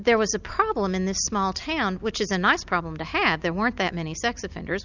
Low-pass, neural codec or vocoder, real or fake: 7.2 kHz; none; real